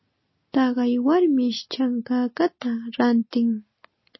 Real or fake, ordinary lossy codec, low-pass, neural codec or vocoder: real; MP3, 24 kbps; 7.2 kHz; none